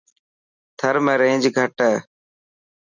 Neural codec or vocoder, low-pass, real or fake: none; 7.2 kHz; real